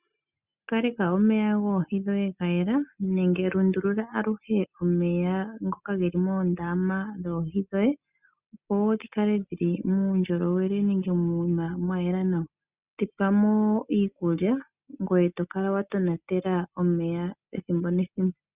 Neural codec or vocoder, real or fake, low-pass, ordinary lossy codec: none; real; 3.6 kHz; Opus, 64 kbps